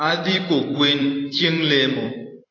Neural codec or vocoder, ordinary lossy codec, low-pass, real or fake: vocoder, 44.1 kHz, 128 mel bands every 256 samples, BigVGAN v2; AAC, 32 kbps; 7.2 kHz; fake